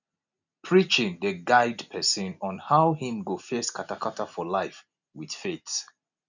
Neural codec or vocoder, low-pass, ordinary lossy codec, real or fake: none; 7.2 kHz; none; real